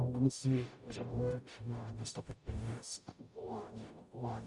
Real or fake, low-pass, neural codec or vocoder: fake; 10.8 kHz; codec, 44.1 kHz, 0.9 kbps, DAC